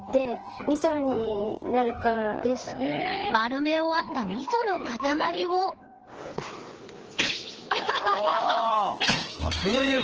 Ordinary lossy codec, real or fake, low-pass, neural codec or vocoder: Opus, 16 kbps; fake; 7.2 kHz; codec, 16 kHz, 2 kbps, FreqCodec, larger model